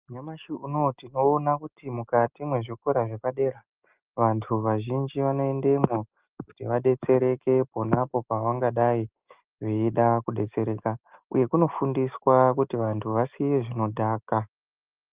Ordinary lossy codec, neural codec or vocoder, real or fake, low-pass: Opus, 32 kbps; none; real; 3.6 kHz